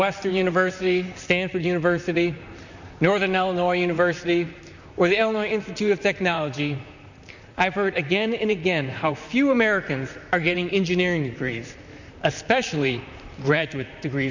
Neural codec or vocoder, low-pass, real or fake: vocoder, 44.1 kHz, 128 mel bands, Pupu-Vocoder; 7.2 kHz; fake